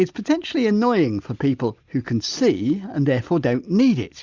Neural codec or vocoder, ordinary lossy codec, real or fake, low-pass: none; Opus, 64 kbps; real; 7.2 kHz